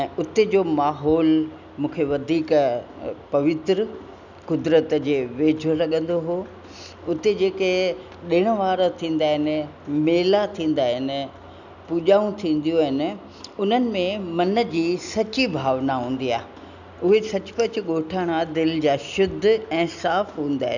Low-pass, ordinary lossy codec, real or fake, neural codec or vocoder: 7.2 kHz; none; real; none